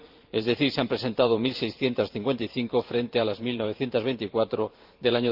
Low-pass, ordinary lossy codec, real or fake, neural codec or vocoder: 5.4 kHz; Opus, 32 kbps; fake; vocoder, 44.1 kHz, 128 mel bands every 512 samples, BigVGAN v2